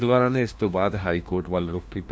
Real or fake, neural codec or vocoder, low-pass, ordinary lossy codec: fake; codec, 16 kHz, 1 kbps, FunCodec, trained on LibriTTS, 50 frames a second; none; none